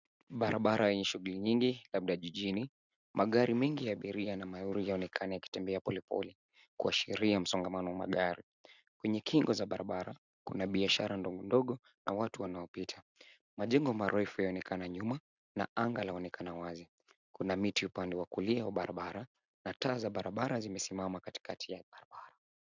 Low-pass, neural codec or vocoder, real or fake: 7.2 kHz; none; real